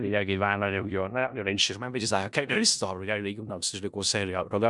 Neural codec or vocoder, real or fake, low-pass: codec, 16 kHz in and 24 kHz out, 0.4 kbps, LongCat-Audio-Codec, four codebook decoder; fake; 10.8 kHz